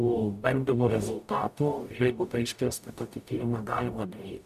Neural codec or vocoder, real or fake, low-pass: codec, 44.1 kHz, 0.9 kbps, DAC; fake; 14.4 kHz